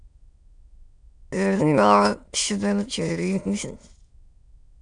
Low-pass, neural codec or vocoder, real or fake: 9.9 kHz; autoencoder, 22.05 kHz, a latent of 192 numbers a frame, VITS, trained on many speakers; fake